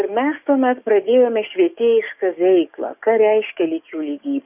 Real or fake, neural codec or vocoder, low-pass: fake; codec, 44.1 kHz, 7.8 kbps, DAC; 3.6 kHz